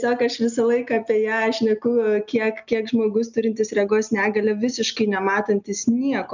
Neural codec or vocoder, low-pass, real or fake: none; 7.2 kHz; real